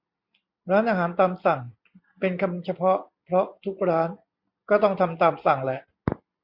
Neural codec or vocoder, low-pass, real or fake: none; 5.4 kHz; real